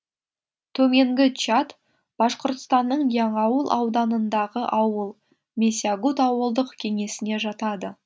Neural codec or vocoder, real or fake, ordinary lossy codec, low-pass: none; real; none; none